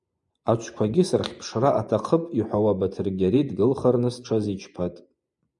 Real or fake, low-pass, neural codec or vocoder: fake; 10.8 kHz; vocoder, 24 kHz, 100 mel bands, Vocos